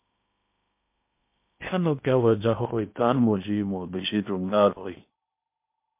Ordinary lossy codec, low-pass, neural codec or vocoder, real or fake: MP3, 32 kbps; 3.6 kHz; codec, 16 kHz in and 24 kHz out, 0.8 kbps, FocalCodec, streaming, 65536 codes; fake